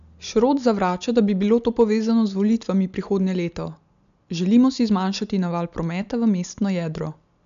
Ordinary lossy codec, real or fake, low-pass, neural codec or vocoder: none; real; 7.2 kHz; none